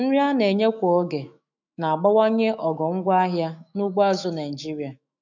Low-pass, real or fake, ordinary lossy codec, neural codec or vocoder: 7.2 kHz; fake; none; autoencoder, 48 kHz, 128 numbers a frame, DAC-VAE, trained on Japanese speech